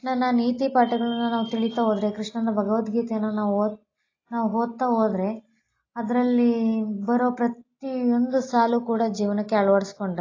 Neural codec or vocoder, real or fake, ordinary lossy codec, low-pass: none; real; AAC, 32 kbps; 7.2 kHz